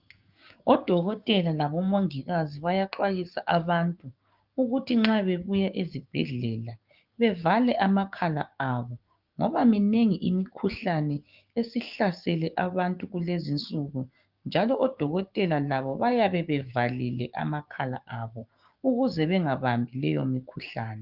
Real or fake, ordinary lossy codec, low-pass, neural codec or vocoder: fake; Opus, 24 kbps; 5.4 kHz; codec, 16 kHz, 6 kbps, DAC